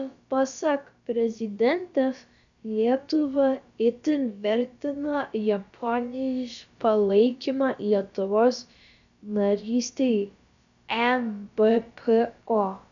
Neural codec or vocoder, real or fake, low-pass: codec, 16 kHz, about 1 kbps, DyCAST, with the encoder's durations; fake; 7.2 kHz